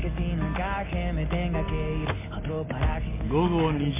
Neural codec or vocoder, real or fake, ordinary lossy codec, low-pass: none; real; MP3, 24 kbps; 3.6 kHz